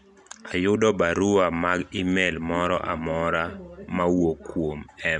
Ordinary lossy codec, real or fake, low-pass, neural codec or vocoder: none; real; 10.8 kHz; none